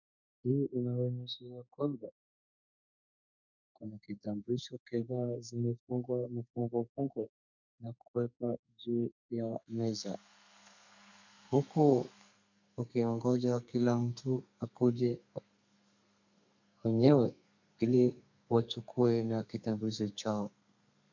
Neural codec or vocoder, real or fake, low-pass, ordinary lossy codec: codec, 32 kHz, 1.9 kbps, SNAC; fake; 7.2 kHz; MP3, 64 kbps